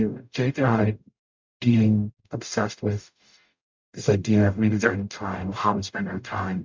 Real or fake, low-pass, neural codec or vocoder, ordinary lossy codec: fake; 7.2 kHz; codec, 44.1 kHz, 0.9 kbps, DAC; MP3, 64 kbps